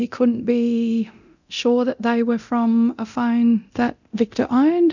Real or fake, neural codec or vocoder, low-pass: fake; codec, 24 kHz, 0.9 kbps, DualCodec; 7.2 kHz